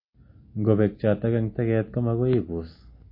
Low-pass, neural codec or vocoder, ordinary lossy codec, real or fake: 5.4 kHz; none; MP3, 24 kbps; real